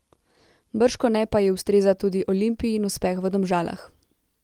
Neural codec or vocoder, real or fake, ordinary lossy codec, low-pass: none; real; Opus, 24 kbps; 19.8 kHz